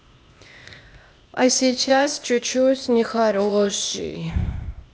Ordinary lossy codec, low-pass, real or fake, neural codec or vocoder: none; none; fake; codec, 16 kHz, 0.8 kbps, ZipCodec